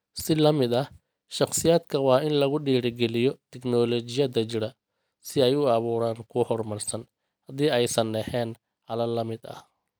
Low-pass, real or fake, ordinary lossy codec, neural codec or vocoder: none; real; none; none